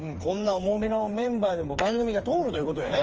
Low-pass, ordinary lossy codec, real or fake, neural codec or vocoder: 7.2 kHz; Opus, 24 kbps; fake; codec, 16 kHz, 4 kbps, FreqCodec, smaller model